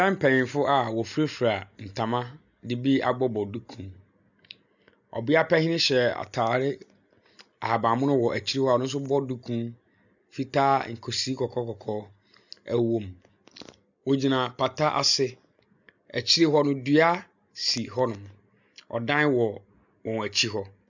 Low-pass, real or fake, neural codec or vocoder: 7.2 kHz; real; none